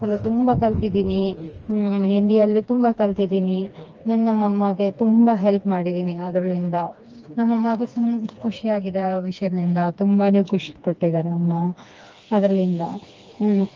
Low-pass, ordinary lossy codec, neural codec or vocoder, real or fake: 7.2 kHz; Opus, 24 kbps; codec, 16 kHz, 2 kbps, FreqCodec, smaller model; fake